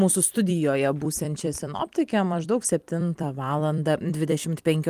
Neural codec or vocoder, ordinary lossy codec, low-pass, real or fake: vocoder, 44.1 kHz, 128 mel bands every 256 samples, BigVGAN v2; Opus, 24 kbps; 14.4 kHz; fake